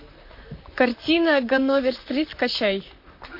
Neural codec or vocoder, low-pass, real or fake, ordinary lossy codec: vocoder, 44.1 kHz, 128 mel bands, Pupu-Vocoder; 5.4 kHz; fake; MP3, 32 kbps